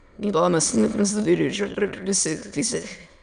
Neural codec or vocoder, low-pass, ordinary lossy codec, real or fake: autoencoder, 22.05 kHz, a latent of 192 numbers a frame, VITS, trained on many speakers; 9.9 kHz; none; fake